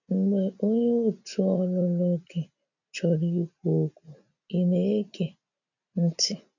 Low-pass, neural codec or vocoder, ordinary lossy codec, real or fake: 7.2 kHz; none; none; real